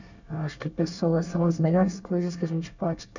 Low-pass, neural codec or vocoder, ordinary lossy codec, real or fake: 7.2 kHz; codec, 24 kHz, 1 kbps, SNAC; none; fake